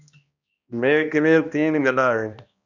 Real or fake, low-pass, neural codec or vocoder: fake; 7.2 kHz; codec, 16 kHz, 1 kbps, X-Codec, HuBERT features, trained on balanced general audio